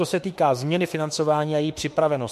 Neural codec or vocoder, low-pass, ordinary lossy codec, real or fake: autoencoder, 48 kHz, 32 numbers a frame, DAC-VAE, trained on Japanese speech; 14.4 kHz; MP3, 64 kbps; fake